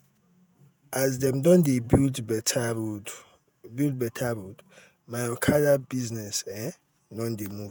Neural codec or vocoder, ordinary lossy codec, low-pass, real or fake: vocoder, 48 kHz, 128 mel bands, Vocos; none; none; fake